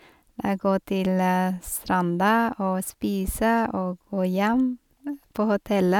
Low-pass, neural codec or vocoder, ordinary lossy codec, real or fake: 19.8 kHz; none; none; real